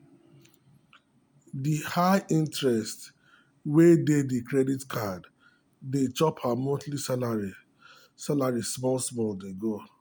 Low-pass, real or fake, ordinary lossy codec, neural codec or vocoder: none; real; none; none